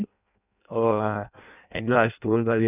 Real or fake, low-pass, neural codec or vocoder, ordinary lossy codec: fake; 3.6 kHz; codec, 16 kHz in and 24 kHz out, 0.6 kbps, FireRedTTS-2 codec; none